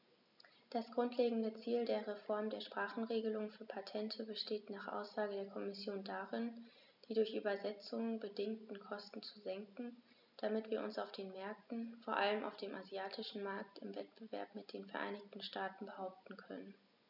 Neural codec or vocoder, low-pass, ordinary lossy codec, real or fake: none; 5.4 kHz; none; real